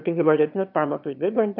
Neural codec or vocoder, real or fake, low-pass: autoencoder, 22.05 kHz, a latent of 192 numbers a frame, VITS, trained on one speaker; fake; 5.4 kHz